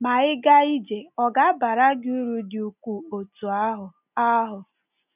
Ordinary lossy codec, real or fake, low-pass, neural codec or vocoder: none; real; 3.6 kHz; none